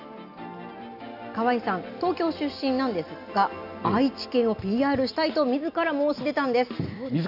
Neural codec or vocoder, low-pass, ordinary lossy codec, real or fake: none; 5.4 kHz; none; real